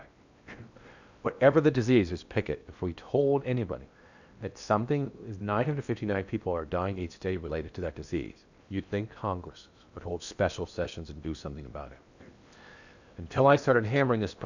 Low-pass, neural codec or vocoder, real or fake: 7.2 kHz; codec, 16 kHz in and 24 kHz out, 0.8 kbps, FocalCodec, streaming, 65536 codes; fake